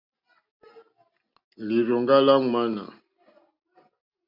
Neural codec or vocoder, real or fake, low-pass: none; real; 5.4 kHz